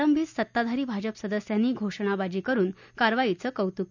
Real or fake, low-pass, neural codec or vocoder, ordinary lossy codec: real; 7.2 kHz; none; none